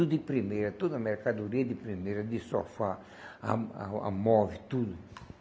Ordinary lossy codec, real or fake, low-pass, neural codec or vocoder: none; real; none; none